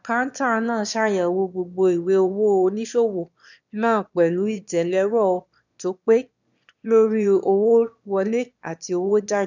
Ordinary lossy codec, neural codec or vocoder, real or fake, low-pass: none; autoencoder, 22.05 kHz, a latent of 192 numbers a frame, VITS, trained on one speaker; fake; 7.2 kHz